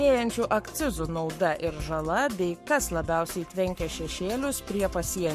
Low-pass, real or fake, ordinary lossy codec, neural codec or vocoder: 14.4 kHz; fake; MP3, 64 kbps; codec, 44.1 kHz, 7.8 kbps, Pupu-Codec